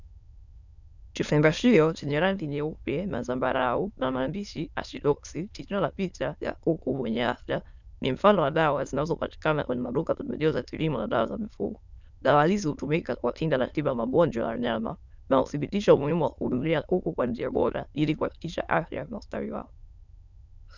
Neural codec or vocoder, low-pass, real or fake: autoencoder, 22.05 kHz, a latent of 192 numbers a frame, VITS, trained on many speakers; 7.2 kHz; fake